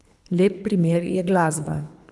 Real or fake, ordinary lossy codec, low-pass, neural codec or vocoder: fake; none; none; codec, 24 kHz, 3 kbps, HILCodec